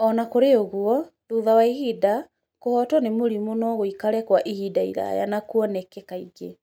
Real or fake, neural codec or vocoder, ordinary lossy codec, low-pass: real; none; none; 19.8 kHz